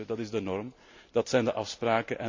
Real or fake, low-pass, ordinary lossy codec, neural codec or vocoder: real; 7.2 kHz; MP3, 64 kbps; none